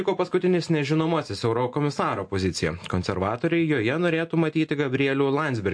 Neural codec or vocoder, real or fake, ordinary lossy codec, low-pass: none; real; MP3, 48 kbps; 9.9 kHz